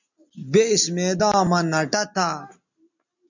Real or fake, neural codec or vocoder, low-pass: real; none; 7.2 kHz